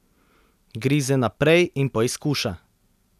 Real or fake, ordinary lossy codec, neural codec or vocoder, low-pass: real; none; none; 14.4 kHz